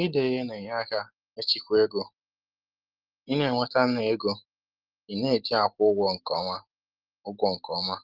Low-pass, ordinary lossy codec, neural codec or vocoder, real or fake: 5.4 kHz; Opus, 16 kbps; none; real